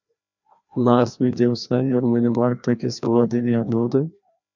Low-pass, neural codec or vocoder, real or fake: 7.2 kHz; codec, 16 kHz, 1 kbps, FreqCodec, larger model; fake